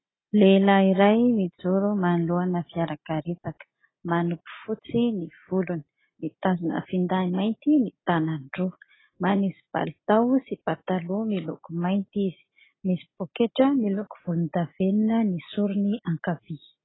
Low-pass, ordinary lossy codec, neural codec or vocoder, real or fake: 7.2 kHz; AAC, 16 kbps; none; real